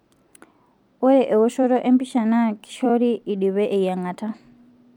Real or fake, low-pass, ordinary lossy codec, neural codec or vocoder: fake; 19.8 kHz; MP3, 96 kbps; vocoder, 44.1 kHz, 128 mel bands every 256 samples, BigVGAN v2